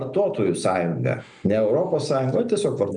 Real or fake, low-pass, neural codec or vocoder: real; 9.9 kHz; none